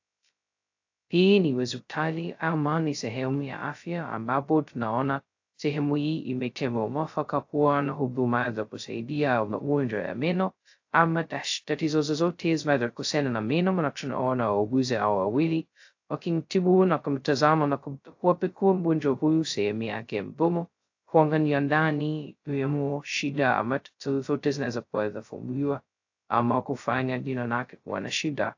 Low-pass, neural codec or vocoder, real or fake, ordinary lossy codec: 7.2 kHz; codec, 16 kHz, 0.2 kbps, FocalCodec; fake; AAC, 48 kbps